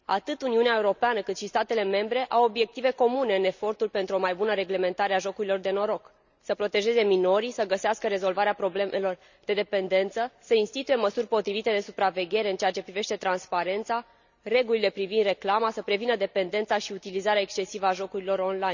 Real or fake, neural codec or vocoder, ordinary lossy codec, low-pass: real; none; none; 7.2 kHz